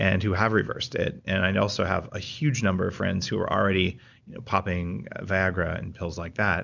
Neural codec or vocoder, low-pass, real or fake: none; 7.2 kHz; real